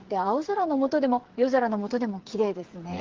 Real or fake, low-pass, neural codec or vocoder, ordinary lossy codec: fake; 7.2 kHz; codec, 16 kHz, 8 kbps, FreqCodec, smaller model; Opus, 16 kbps